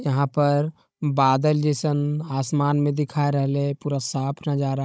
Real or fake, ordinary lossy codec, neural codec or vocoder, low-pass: fake; none; codec, 16 kHz, 16 kbps, FunCodec, trained on Chinese and English, 50 frames a second; none